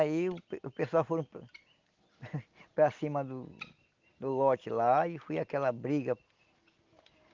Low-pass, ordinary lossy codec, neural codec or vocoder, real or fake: 7.2 kHz; Opus, 32 kbps; none; real